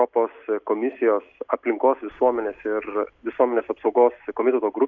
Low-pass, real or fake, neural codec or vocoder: 7.2 kHz; real; none